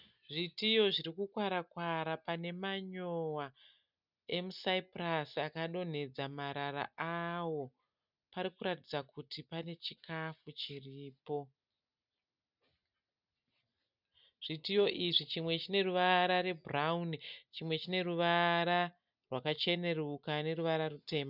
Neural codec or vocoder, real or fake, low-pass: none; real; 5.4 kHz